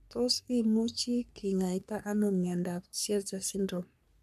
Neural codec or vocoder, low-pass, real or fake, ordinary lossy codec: codec, 44.1 kHz, 3.4 kbps, Pupu-Codec; 14.4 kHz; fake; none